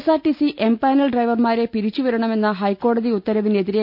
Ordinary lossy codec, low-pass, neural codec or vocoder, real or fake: none; 5.4 kHz; none; real